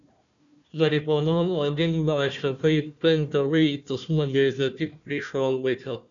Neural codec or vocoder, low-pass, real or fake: codec, 16 kHz, 1 kbps, FunCodec, trained on Chinese and English, 50 frames a second; 7.2 kHz; fake